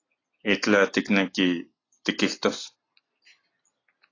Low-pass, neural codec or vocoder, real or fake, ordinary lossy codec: 7.2 kHz; none; real; AAC, 32 kbps